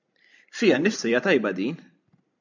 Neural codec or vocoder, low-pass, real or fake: vocoder, 44.1 kHz, 128 mel bands every 256 samples, BigVGAN v2; 7.2 kHz; fake